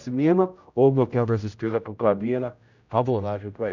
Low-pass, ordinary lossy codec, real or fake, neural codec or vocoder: 7.2 kHz; none; fake; codec, 16 kHz, 0.5 kbps, X-Codec, HuBERT features, trained on general audio